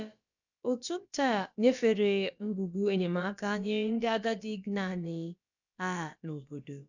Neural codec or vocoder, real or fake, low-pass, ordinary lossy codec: codec, 16 kHz, about 1 kbps, DyCAST, with the encoder's durations; fake; 7.2 kHz; none